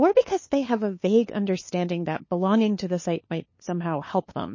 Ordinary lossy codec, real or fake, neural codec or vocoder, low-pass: MP3, 32 kbps; fake; codec, 16 kHz, 2 kbps, FunCodec, trained on LibriTTS, 25 frames a second; 7.2 kHz